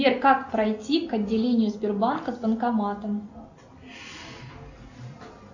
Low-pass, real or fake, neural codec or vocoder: 7.2 kHz; real; none